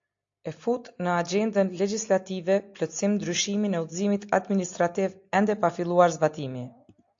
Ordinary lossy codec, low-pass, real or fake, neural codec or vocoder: AAC, 48 kbps; 7.2 kHz; real; none